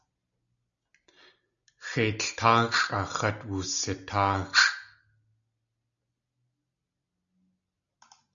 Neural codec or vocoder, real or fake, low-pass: none; real; 7.2 kHz